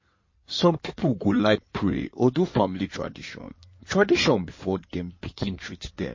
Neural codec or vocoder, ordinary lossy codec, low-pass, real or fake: codec, 16 kHz in and 24 kHz out, 2.2 kbps, FireRedTTS-2 codec; MP3, 32 kbps; 7.2 kHz; fake